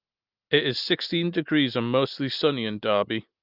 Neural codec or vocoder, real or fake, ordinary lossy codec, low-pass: none; real; Opus, 32 kbps; 5.4 kHz